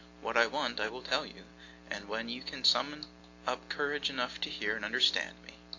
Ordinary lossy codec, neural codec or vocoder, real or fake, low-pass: AAC, 48 kbps; none; real; 7.2 kHz